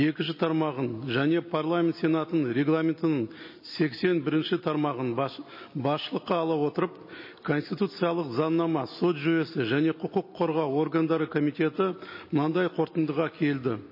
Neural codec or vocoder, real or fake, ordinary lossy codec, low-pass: none; real; MP3, 24 kbps; 5.4 kHz